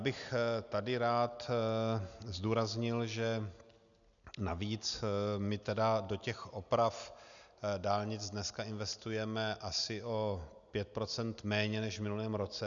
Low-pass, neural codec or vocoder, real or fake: 7.2 kHz; none; real